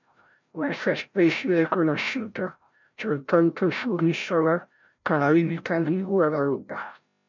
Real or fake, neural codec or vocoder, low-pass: fake; codec, 16 kHz, 0.5 kbps, FreqCodec, larger model; 7.2 kHz